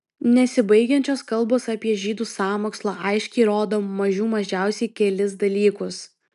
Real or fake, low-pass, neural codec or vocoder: real; 10.8 kHz; none